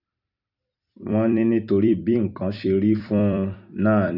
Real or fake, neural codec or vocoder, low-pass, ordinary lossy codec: fake; vocoder, 44.1 kHz, 128 mel bands every 256 samples, BigVGAN v2; 5.4 kHz; none